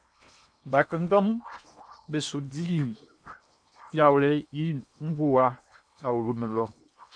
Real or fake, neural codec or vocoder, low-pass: fake; codec, 16 kHz in and 24 kHz out, 0.8 kbps, FocalCodec, streaming, 65536 codes; 9.9 kHz